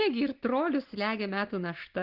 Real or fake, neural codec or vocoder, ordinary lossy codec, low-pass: real; none; Opus, 16 kbps; 5.4 kHz